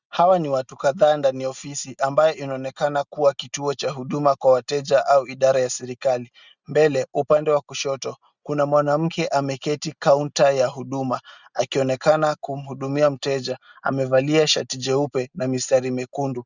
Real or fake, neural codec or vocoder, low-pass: real; none; 7.2 kHz